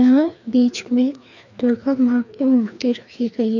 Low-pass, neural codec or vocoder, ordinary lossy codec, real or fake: 7.2 kHz; codec, 16 kHz, 2 kbps, FreqCodec, larger model; none; fake